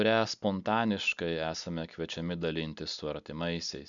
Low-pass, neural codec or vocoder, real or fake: 7.2 kHz; none; real